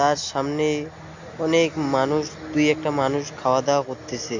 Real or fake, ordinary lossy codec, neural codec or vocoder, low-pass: real; AAC, 48 kbps; none; 7.2 kHz